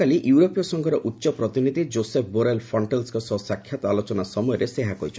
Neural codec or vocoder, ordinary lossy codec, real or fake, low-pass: none; none; real; none